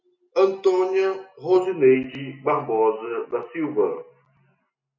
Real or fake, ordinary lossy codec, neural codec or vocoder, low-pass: real; MP3, 48 kbps; none; 7.2 kHz